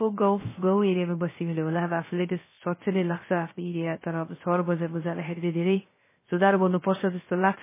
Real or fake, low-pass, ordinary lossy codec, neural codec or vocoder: fake; 3.6 kHz; MP3, 16 kbps; codec, 16 kHz, 0.3 kbps, FocalCodec